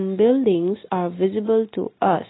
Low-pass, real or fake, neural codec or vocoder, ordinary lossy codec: 7.2 kHz; real; none; AAC, 16 kbps